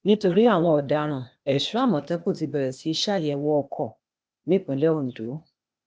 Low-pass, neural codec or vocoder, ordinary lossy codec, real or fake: none; codec, 16 kHz, 0.8 kbps, ZipCodec; none; fake